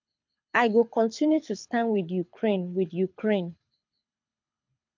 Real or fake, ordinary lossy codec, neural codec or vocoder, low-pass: fake; MP3, 48 kbps; codec, 24 kHz, 6 kbps, HILCodec; 7.2 kHz